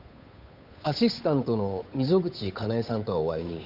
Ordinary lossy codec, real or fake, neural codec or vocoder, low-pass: none; fake; codec, 16 kHz, 8 kbps, FunCodec, trained on Chinese and English, 25 frames a second; 5.4 kHz